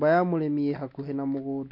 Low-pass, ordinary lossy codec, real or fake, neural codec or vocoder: 5.4 kHz; MP3, 32 kbps; real; none